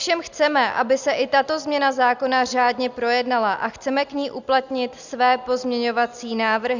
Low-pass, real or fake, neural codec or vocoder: 7.2 kHz; real; none